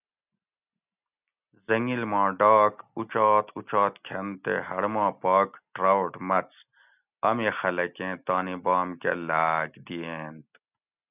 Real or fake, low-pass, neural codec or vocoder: real; 3.6 kHz; none